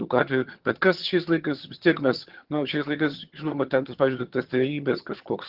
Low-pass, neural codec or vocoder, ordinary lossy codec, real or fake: 5.4 kHz; vocoder, 22.05 kHz, 80 mel bands, HiFi-GAN; Opus, 16 kbps; fake